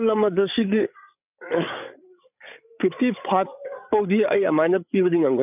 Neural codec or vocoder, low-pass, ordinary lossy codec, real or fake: codec, 16 kHz, 8 kbps, FreqCodec, larger model; 3.6 kHz; none; fake